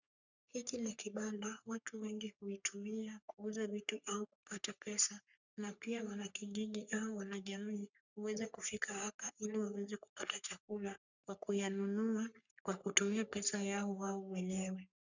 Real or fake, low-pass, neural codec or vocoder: fake; 7.2 kHz; codec, 44.1 kHz, 3.4 kbps, Pupu-Codec